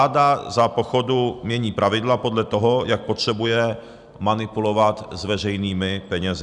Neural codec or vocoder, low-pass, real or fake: none; 10.8 kHz; real